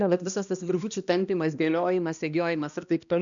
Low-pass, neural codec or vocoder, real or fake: 7.2 kHz; codec, 16 kHz, 1 kbps, X-Codec, HuBERT features, trained on balanced general audio; fake